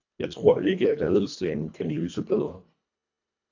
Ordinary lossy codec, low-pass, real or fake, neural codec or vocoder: AAC, 48 kbps; 7.2 kHz; fake; codec, 24 kHz, 1.5 kbps, HILCodec